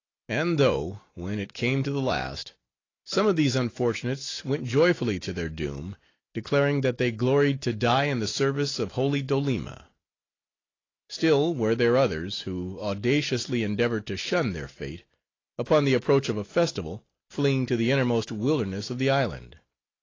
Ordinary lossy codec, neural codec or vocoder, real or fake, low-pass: AAC, 32 kbps; none; real; 7.2 kHz